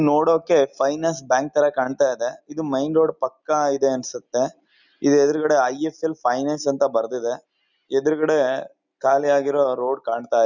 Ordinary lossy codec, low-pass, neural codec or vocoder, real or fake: none; 7.2 kHz; none; real